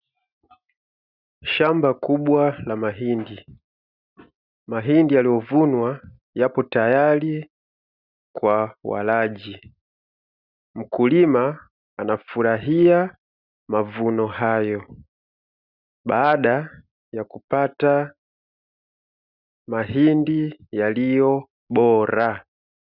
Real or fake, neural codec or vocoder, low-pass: real; none; 5.4 kHz